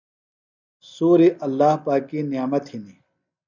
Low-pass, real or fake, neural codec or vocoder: 7.2 kHz; real; none